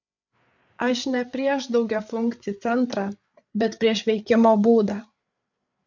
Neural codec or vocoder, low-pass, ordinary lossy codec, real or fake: codec, 16 kHz, 8 kbps, FreqCodec, larger model; 7.2 kHz; MP3, 64 kbps; fake